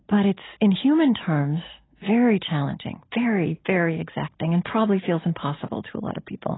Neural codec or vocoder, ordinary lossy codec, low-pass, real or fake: none; AAC, 16 kbps; 7.2 kHz; real